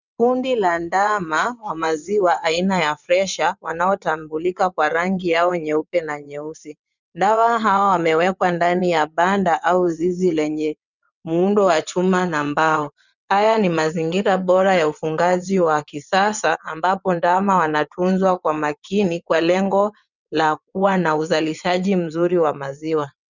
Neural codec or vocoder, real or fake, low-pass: vocoder, 22.05 kHz, 80 mel bands, WaveNeXt; fake; 7.2 kHz